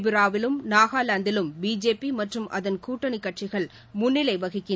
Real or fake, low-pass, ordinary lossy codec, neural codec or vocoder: real; none; none; none